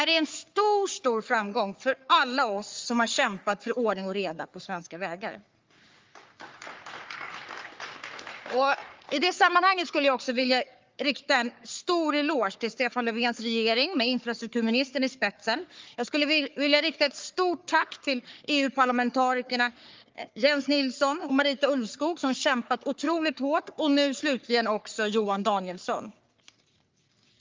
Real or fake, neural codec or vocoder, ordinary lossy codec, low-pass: fake; codec, 44.1 kHz, 3.4 kbps, Pupu-Codec; Opus, 32 kbps; 7.2 kHz